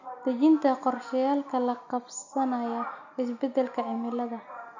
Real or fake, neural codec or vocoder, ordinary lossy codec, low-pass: real; none; none; 7.2 kHz